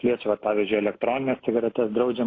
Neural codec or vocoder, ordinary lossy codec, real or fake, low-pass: none; AAC, 32 kbps; real; 7.2 kHz